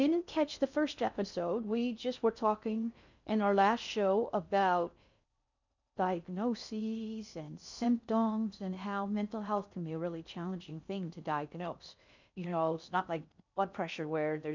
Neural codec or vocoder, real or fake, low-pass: codec, 16 kHz in and 24 kHz out, 0.6 kbps, FocalCodec, streaming, 2048 codes; fake; 7.2 kHz